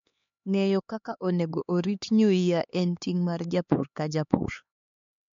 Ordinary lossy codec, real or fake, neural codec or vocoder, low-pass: MP3, 48 kbps; fake; codec, 16 kHz, 4 kbps, X-Codec, HuBERT features, trained on LibriSpeech; 7.2 kHz